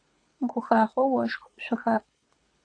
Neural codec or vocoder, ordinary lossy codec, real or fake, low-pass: codec, 24 kHz, 6 kbps, HILCodec; AAC, 48 kbps; fake; 9.9 kHz